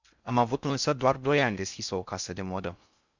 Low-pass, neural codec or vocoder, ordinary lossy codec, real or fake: 7.2 kHz; codec, 16 kHz in and 24 kHz out, 0.6 kbps, FocalCodec, streaming, 4096 codes; Opus, 64 kbps; fake